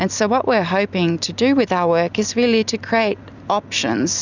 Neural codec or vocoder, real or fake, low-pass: none; real; 7.2 kHz